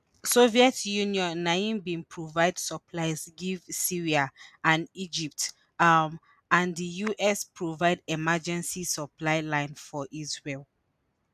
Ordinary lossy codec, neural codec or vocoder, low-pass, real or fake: none; none; 14.4 kHz; real